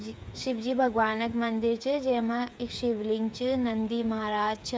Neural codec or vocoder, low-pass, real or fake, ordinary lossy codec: codec, 16 kHz, 8 kbps, FreqCodec, smaller model; none; fake; none